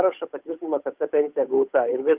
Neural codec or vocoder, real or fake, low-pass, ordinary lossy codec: codec, 16 kHz, 8 kbps, FunCodec, trained on Chinese and English, 25 frames a second; fake; 3.6 kHz; Opus, 32 kbps